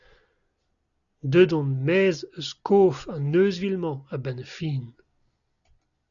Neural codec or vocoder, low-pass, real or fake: none; 7.2 kHz; real